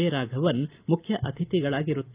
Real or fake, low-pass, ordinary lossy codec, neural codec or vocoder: real; 3.6 kHz; Opus, 32 kbps; none